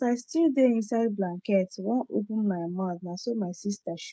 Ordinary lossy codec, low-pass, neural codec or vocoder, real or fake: none; none; codec, 16 kHz, 16 kbps, FreqCodec, smaller model; fake